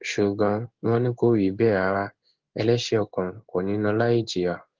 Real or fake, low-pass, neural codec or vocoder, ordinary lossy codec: fake; 7.2 kHz; codec, 16 kHz in and 24 kHz out, 1 kbps, XY-Tokenizer; Opus, 16 kbps